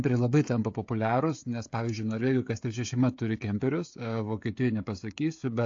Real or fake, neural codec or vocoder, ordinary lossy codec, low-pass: fake; codec, 16 kHz, 16 kbps, FreqCodec, smaller model; AAC, 48 kbps; 7.2 kHz